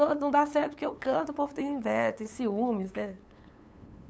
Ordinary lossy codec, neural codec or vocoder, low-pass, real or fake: none; codec, 16 kHz, 8 kbps, FunCodec, trained on LibriTTS, 25 frames a second; none; fake